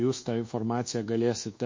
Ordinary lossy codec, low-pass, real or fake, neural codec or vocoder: MP3, 32 kbps; 7.2 kHz; fake; codec, 24 kHz, 1.2 kbps, DualCodec